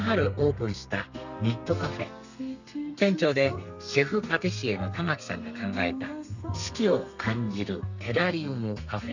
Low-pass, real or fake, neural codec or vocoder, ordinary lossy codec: 7.2 kHz; fake; codec, 32 kHz, 1.9 kbps, SNAC; none